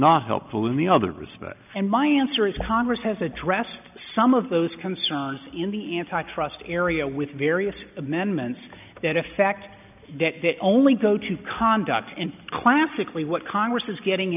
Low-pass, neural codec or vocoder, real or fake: 3.6 kHz; none; real